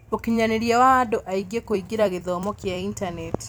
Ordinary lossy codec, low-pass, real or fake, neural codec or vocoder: none; none; fake; vocoder, 44.1 kHz, 128 mel bands every 256 samples, BigVGAN v2